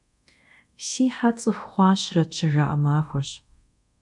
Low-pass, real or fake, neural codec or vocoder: 10.8 kHz; fake; codec, 24 kHz, 0.5 kbps, DualCodec